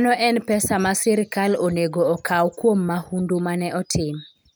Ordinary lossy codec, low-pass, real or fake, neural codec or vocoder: none; none; real; none